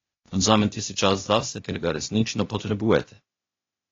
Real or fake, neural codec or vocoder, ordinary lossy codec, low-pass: fake; codec, 16 kHz, 0.8 kbps, ZipCodec; AAC, 32 kbps; 7.2 kHz